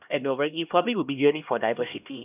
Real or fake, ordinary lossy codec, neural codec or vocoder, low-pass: fake; none; codec, 16 kHz, 1 kbps, X-Codec, HuBERT features, trained on LibriSpeech; 3.6 kHz